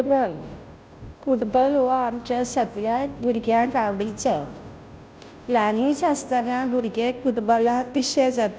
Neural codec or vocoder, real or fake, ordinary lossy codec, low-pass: codec, 16 kHz, 0.5 kbps, FunCodec, trained on Chinese and English, 25 frames a second; fake; none; none